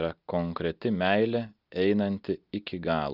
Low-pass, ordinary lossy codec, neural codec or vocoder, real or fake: 5.4 kHz; Opus, 32 kbps; none; real